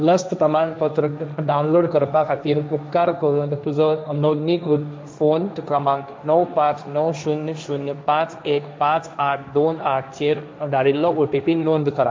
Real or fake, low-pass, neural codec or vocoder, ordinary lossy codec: fake; none; codec, 16 kHz, 1.1 kbps, Voila-Tokenizer; none